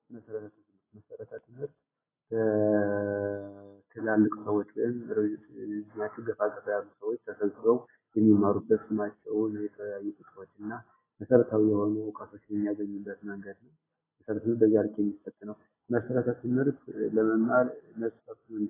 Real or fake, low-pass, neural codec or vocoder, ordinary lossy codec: real; 3.6 kHz; none; AAC, 16 kbps